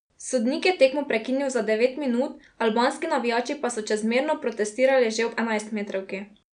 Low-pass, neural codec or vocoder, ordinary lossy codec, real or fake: 9.9 kHz; none; none; real